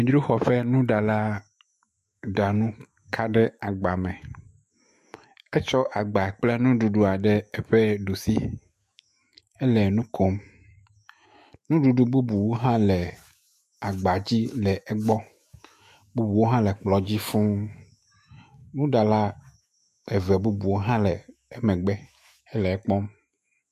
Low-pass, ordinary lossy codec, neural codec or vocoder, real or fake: 14.4 kHz; MP3, 64 kbps; autoencoder, 48 kHz, 128 numbers a frame, DAC-VAE, trained on Japanese speech; fake